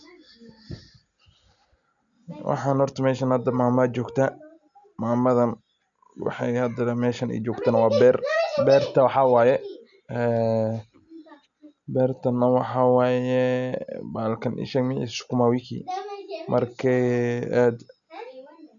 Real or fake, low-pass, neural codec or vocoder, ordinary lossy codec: real; 7.2 kHz; none; none